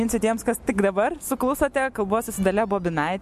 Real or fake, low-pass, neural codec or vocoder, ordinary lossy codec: real; 14.4 kHz; none; MP3, 64 kbps